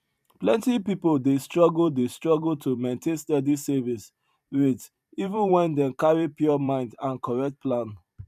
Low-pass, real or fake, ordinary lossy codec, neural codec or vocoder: 14.4 kHz; fake; none; vocoder, 44.1 kHz, 128 mel bands every 512 samples, BigVGAN v2